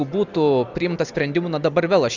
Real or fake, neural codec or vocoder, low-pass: real; none; 7.2 kHz